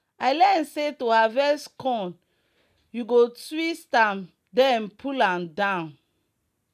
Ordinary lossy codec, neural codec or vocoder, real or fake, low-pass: none; none; real; 14.4 kHz